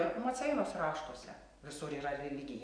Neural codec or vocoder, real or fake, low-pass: none; real; 9.9 kHz